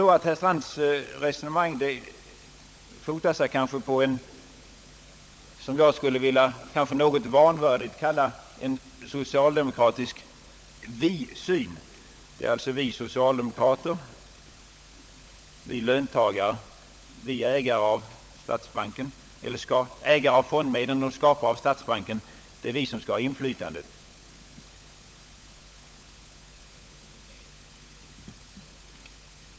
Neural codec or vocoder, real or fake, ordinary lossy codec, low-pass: codec, 16 kHz, 16 kbps, FunCodec, trained on LibriTTS, 50 frames a second; fake; none; none